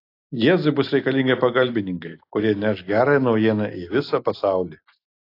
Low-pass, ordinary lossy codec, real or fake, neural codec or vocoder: 5.4 kHz; AAC, 32 kbps; real; none